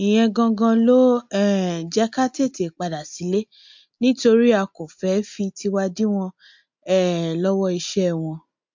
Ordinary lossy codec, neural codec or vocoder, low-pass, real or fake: MP3, 48 kbps; none; 7.2 kHz; real